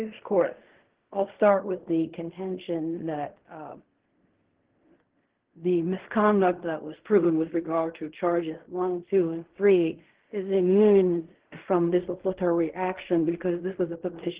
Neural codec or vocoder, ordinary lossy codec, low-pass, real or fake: codec, 16 kHz in and 24 kHz out, 0.4 kbps, LongCat-Audio-Codec, fine tuned four codebook decoder; Opus, 16 kbps; 3.6 kHz; fake